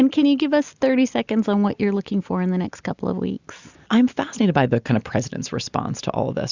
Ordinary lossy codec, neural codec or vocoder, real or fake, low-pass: Opus, 64 kbps; none; real; 7.2 kHz